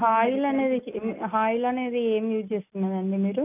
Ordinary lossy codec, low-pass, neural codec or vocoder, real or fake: none; 3.6 kHz; none; real